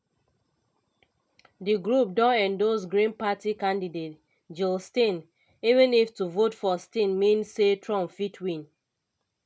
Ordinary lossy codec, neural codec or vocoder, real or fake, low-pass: none; none; real; none